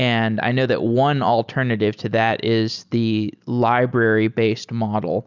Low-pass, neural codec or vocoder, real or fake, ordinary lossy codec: 7.2 kHz; none; real; Opus, 64 kbps